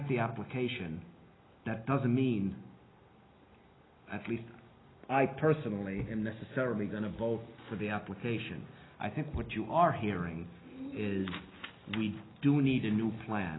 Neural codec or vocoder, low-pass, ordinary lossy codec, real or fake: none; 7.2 kHz; AAC, 16 kbps; real